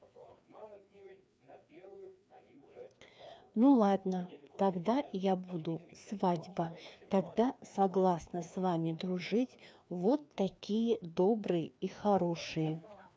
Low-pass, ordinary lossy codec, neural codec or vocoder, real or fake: none; none; codec, 16 kHz, 2 kbps, FreqCodec, larger model; fake